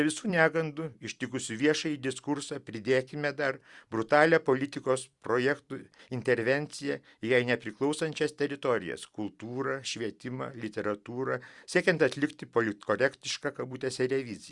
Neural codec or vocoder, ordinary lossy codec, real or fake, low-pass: vocoder, 44.1 kHz, 128 mel bands every 256 samples, BigVGAN v2; Opus, 64 kbps; fake; 10.8 kHz